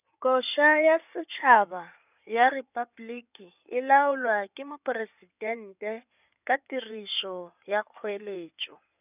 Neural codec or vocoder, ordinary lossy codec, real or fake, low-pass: codec, 16 kHz in and 24 kHz out, 2.2 kbps, FireRedTTS-2 codec; none; fake; 3.6 kHz